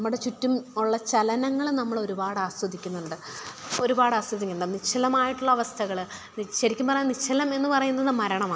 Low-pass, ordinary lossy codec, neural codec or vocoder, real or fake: none; none; none; real